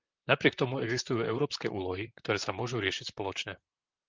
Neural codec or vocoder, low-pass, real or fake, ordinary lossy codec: vocoder, 44.1 kHz, 128 mel bands, Pupu-Vocoder; 7.2 kHz; fake; Opus, 32 kbps